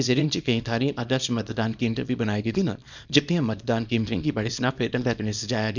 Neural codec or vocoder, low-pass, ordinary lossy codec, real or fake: codec, 24 kHz, 0.9 kbps, WavTokenizer, small release; 7.2 kHz; none; fake